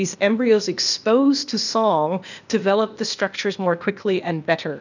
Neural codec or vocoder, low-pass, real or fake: codec, 16 kHz, 0.8 kbps, ZipCodec; 7.2 kHz; fake